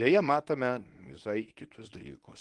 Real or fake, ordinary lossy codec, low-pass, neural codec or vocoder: fake; Opus, 24 kbps; 10.8 kHz; codec, 24 kHz, 0.9 kbps, WavTokenizer, medium speech release version 1